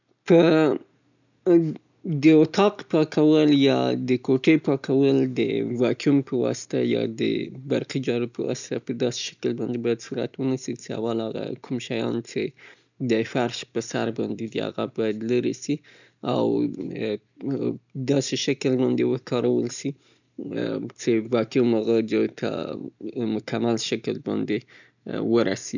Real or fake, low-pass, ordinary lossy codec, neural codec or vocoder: real; 7.2 kHz; none; none